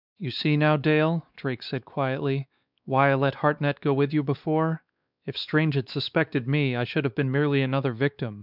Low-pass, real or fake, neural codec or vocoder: 5.4 kHz; fake; codec, 16 kHz, 4 kbps, X-Codec, WavLM features, trained on Multilingual LibriSpeech